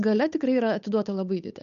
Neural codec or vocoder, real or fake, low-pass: codec, 16 kHz, 8 kbps, FunCodec, trained on Chinese and English, 25 frames a second; fake; 7.2 kHz